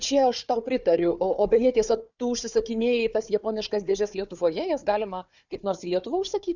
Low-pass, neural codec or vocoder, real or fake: 7.2 kHz; codec, 16 kHz, 4 kbps, FunCodec, trained on Chinese and English, 50 frames a second; fake